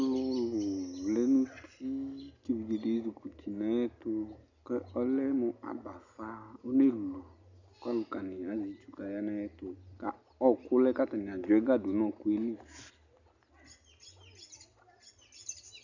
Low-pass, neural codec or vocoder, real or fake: 7.2 kHz; none; real